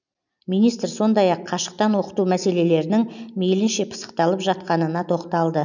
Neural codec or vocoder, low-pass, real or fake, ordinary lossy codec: none; 7.2 kHz; real; none